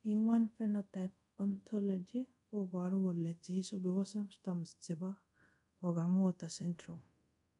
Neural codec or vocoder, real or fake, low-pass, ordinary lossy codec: codec, 24 kHz, 0.5 kbps, DualCodec; fake; 10.8 kHz; none